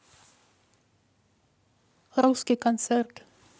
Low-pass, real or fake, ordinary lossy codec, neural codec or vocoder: none; real; none; none